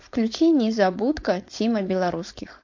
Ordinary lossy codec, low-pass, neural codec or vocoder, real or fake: MP3, 48 kbps; 7.2 kHz; codec, 16 kHz, 4.8 kbps, FACodec; fake